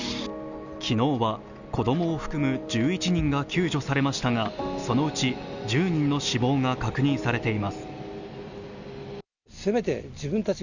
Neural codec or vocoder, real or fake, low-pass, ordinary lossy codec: none; real; 7.2 kHz; none